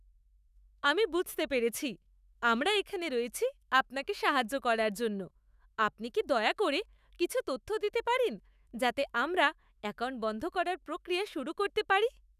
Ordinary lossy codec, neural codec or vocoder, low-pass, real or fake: none; autoencoder, 48 kHz, 128 numbers a frame, DAC-VAE, trained on Japanese speech; 14.4 kHz; fake